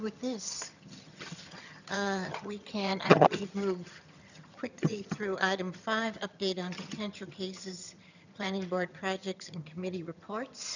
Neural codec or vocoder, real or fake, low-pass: vocoder, 22.05 kHz, 80 mel bands, HiFi-GAN; fake; 7.2 kHz